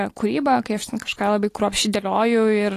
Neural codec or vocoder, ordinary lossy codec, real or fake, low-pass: none; AAC, 48 kbps; real; 14.4 kHz